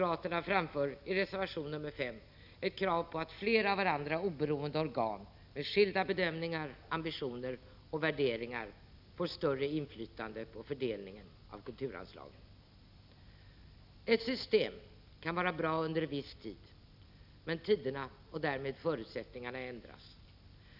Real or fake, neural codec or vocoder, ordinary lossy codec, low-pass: real; none; none; 5.4 kHz